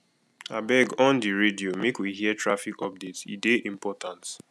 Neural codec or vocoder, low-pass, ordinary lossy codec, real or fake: none; none; none; real